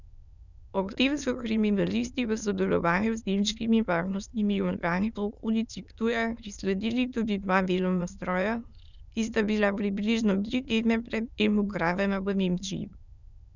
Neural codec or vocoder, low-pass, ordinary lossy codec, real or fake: autoencoder, 22.05 kHz, a latent of 192 numbers a frame, VITS, trained on many speakers; 7.2 kHz; none; fake